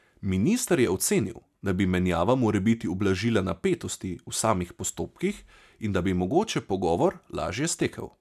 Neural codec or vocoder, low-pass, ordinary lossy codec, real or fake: none; 14.4 kHz; none; real